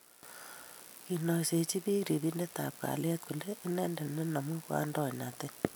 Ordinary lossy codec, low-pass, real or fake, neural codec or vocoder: none; none; real; none